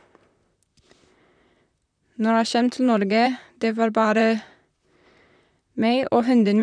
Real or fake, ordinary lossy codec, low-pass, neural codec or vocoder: fake; none; 9.9 kHz; vocoder, 44.1 kHz, 128 mel bands, Pupu-Vocoder